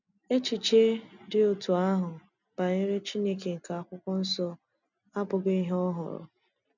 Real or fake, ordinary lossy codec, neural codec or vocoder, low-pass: real; none; none; 7.2 kHz